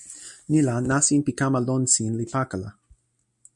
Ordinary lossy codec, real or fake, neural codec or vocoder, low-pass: MP3, 64 kbps; real; none; 10.8 kHz